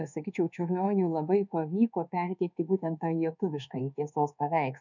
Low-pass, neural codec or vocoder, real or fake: 7.2 kHz; codec, 24 kHz, 1.2 kbps, DualCodec; fake